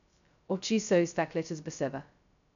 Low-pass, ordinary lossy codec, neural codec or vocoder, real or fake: 7.2 kHz; none; codec, 16 kHz, 0.2 kbps, FocalCodec; fake